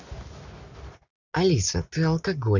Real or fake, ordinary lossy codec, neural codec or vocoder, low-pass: real; none; none; 7.2 kHz